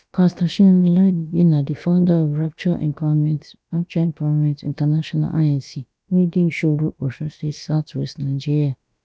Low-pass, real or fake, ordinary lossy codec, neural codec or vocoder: none; fake; none; codec, 16 kHz, about 1 kbps, DyCAST, with the encoder's durations